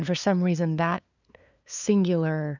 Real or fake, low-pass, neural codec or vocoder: fake; 7.2 kHz; codec, 16 kHz, 2 kbps, FunCodec, trained on LibriTTS, 25 frames a second